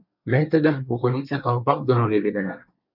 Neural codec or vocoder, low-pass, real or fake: codec, 24 kHz, 1 kbps, SNAC; 5.4 kHz; fake